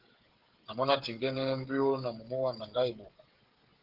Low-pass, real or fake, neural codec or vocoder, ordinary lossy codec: 5.4 kHz; fake; codec, 16 kHz, 16 kbps, FreqCodec, smaller model; Opus, 16 kbps